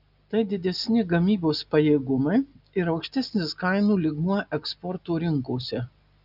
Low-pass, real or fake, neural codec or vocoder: 5.4 kHz; real; none